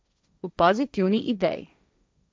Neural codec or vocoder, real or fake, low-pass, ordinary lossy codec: codec, 16 kHz, 1.1 kbps, Voila-Tokenizer; fake; none; none